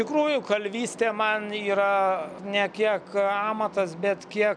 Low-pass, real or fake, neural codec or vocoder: 9.9 kHz; real; none